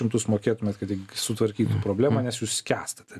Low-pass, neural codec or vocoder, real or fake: 14.4 kHz; none; real